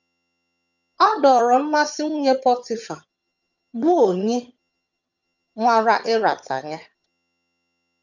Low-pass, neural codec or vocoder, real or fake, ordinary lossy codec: 7.2 kHz; vocoder, 22.05 kHz, 80 mel bands, HiFi-GAN; fake; none